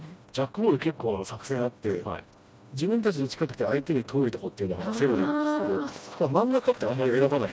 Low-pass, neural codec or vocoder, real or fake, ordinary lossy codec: none; codec, 16 kHz, 1 kbps, FreqCodec, smaller model; fake; none